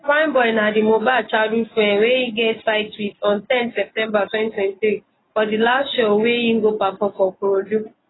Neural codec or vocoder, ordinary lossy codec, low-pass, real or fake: none; AAC, 16 kbps; 7.2 kHz; real